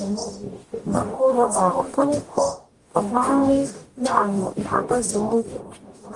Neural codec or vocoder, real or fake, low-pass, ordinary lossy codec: codec, 44.1 kHz, 0.9 kbps, DAC; fake; 10.8 kHz; Opus, 32 kbps